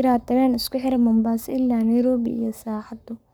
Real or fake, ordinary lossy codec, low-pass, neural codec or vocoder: fake; none; none; codec, 44.1 kHz, 7.8 kbps, Pupu-Codec